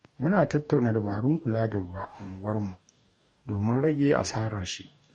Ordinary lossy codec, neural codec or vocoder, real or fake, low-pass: MP3, 48 kbps; codec, 44.1 kHz, 2.6 kbps, DAC; fake; 19.8 kHz